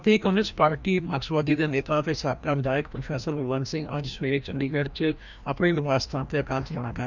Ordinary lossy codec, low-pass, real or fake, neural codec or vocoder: none; 7.2 kHz; fake; codec, 16 kHz, 1 kbps, FreqCodec, larger model